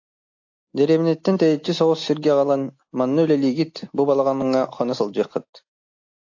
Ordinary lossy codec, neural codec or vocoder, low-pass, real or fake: AAC, 48 kbps; none; 7.2 kHz; real